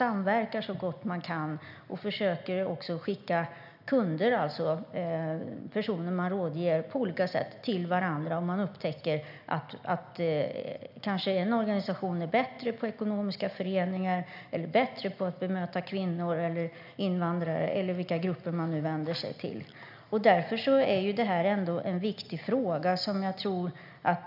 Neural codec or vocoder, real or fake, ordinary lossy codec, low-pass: none; real; none; 5.4 kHz